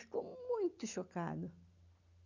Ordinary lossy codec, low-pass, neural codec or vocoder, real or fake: none; 7.2 kHz; none; real